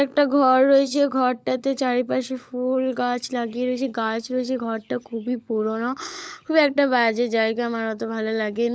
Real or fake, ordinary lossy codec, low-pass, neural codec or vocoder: fake; none; none; codec, 16 kHz, 16 kbps, FunCodec, trained on LibriTTS, 50 frames a second